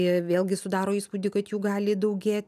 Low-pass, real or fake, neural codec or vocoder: 14.4 kHz; real; none